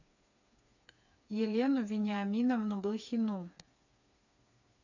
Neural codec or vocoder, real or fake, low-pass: codec, 16 kHz, 4 kbps, FreqCodec, smaller model; fake; 7.2 kHz